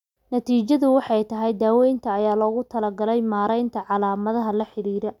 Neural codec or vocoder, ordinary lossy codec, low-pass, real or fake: none; none; 19.8 kHz; real